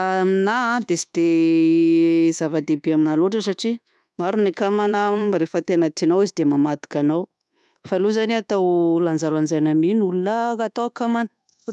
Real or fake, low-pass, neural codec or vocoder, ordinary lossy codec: fake; none; codec, 24 kHz, 1.2 kbps, DualCodec; none